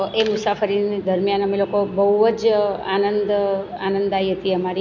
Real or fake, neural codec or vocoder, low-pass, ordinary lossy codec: real; none; 7.2 kHz; none